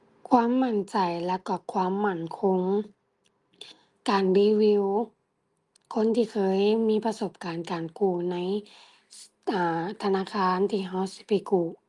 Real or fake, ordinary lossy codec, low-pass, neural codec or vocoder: real; Opus, 32 kbps; 10.8 kHz; none